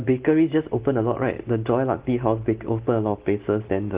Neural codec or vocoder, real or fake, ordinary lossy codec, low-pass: none; real; Opus, 16 kbps; 3.6 kHz